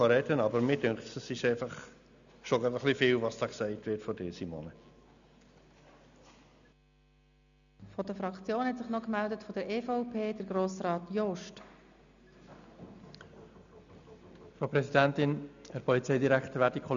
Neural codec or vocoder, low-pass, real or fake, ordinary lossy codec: none; 7.2 kHz; real; none